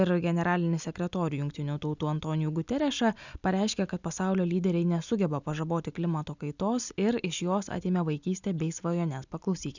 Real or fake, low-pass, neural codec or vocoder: real; 7.2 kHz; none